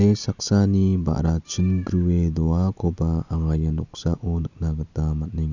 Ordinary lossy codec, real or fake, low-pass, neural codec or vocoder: none; real; 7.2 kHz; none